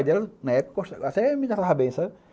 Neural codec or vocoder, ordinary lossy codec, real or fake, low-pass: none; none; real; none